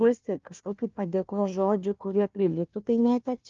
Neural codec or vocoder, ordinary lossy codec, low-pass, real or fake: codec, 16 kHz, 1 kbps, FunCodec, trained on Chinese and English, 50 frames a second; Opus, 32 kbps; 7.2 kHz; fake